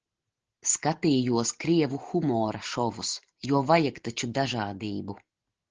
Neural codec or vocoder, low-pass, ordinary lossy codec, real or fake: none; 7.2 kHz; Opus, 16 kbps; real